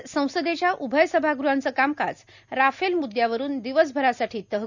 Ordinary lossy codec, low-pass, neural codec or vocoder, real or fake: none; 7.2 kHz; none; real